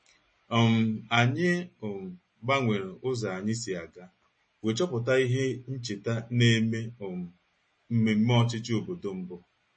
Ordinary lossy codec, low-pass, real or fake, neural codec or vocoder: MP3, 32 kbps; 9.9 kHz; real; none